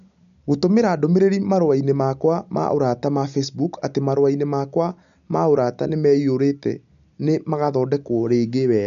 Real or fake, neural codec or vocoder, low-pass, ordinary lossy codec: real; none; 7.2 kHz; none